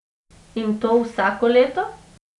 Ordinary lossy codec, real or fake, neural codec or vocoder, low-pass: none; real; none; 10.8 kHz